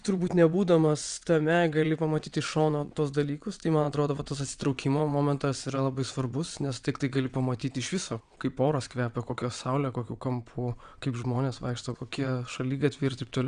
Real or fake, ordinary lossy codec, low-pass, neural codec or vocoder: fake; Opus, 64 kbps; 9.9 kHz; vocoder, 22.05 kHz, 80 mel bands, WaveNeXt